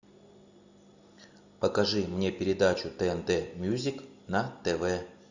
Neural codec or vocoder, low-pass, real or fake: none; 7.2 kHz; real